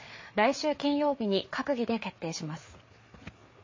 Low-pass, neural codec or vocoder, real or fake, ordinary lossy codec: 7.2 kHz; codec, 16 kHz, 4 kbps, FreqCodec, larger model; fake; MP3, 32 kbps